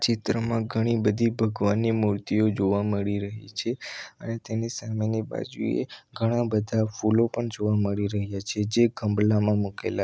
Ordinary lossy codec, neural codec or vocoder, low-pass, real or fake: none; none; none; real